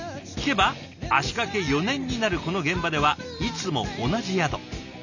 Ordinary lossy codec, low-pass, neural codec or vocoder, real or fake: none; 7.2 kHz; none; real